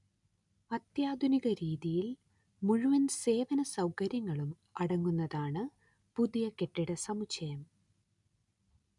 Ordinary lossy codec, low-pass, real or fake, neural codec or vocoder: none; 10.8 kHz; real; none